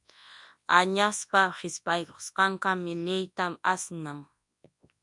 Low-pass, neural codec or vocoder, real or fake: 10.8 kHz; codec, 24 kHz, 0.9 kbps, WavTokenizer, large speech release; fake